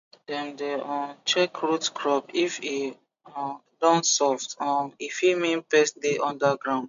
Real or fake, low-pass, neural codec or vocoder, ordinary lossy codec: real; 7.2 kHz; none; MP3, 64 kbps